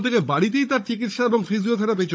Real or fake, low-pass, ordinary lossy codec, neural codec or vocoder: fake; none; none; codec, 16 kHz, 16 kbps, FunCodec, trained on Chinese and English, 50 frames a second